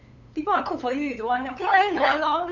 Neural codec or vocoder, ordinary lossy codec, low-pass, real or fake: codec, 16 kHz, 8 kbps, FunCodec, trained on LibriTTS, 25 frames a second; none; 7.2 kHz; fake